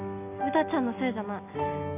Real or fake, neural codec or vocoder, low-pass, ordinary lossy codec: real; none; 3.6 kHz; none